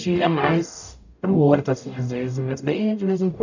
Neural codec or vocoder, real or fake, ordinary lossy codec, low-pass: codec, 44.1 kHz, 0.9 kbps, DAC; fake; none; 7.2 kHz